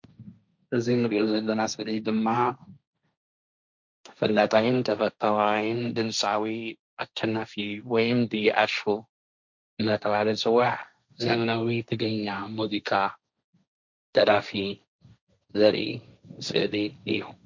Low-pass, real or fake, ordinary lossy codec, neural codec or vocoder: 7.2 kHz; fake; MP3, 64 kbps; codec, 16 kHz, 1.1 kbps, Voila-Tokenizer